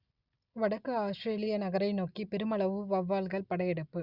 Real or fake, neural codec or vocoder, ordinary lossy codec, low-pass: real; none; Opus, 64 kbps; 5.4 kHz